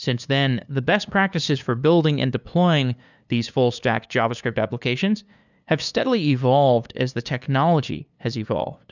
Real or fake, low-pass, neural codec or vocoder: fake; 7.2 kHz; codec, 16 kHz, 2 kbps, FunCodec, trained on LibriTTS, 25 frames a second